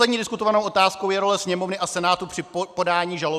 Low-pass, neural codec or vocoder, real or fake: 14.4 kHz; none; real